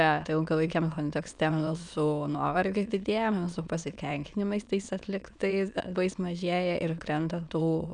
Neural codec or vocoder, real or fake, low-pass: autoencoder, 22.05 kHz, a latent of 192 numbers a frame, VITS, trained on many speakers; fake; 9.9 kHz